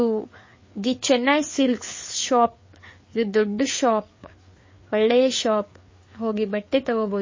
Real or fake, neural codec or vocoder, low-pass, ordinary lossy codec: fake; codec, 16 kHz, 4 kbps, FreqCodec, larger model; 7.2 kHz; MP3, 32 kbps